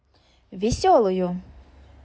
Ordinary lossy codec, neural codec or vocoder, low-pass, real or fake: none; none; none; real